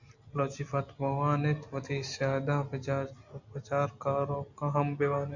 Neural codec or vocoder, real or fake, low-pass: none; real; 7.2 kHz